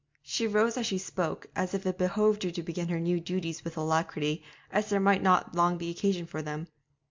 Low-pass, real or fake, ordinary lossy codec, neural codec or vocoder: 7.2 kHz; real; MP3, 64 kbps; none